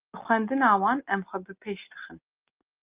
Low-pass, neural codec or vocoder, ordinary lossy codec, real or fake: 3.6 kHz; none; Opus, 24 kbps; real